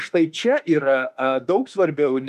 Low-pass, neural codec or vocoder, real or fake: 14.4 kHz; autoencoder, 48 kHz, 32 numbers a frame, DAC-VAE, trained on Japanese speech; fake